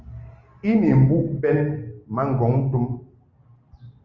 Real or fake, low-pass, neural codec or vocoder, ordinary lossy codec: real; 7.2 kHz; none; Opus, 32 kbps